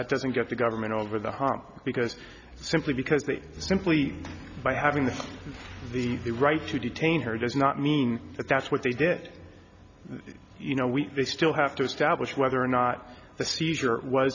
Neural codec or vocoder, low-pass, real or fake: none; 7.2 kHz; real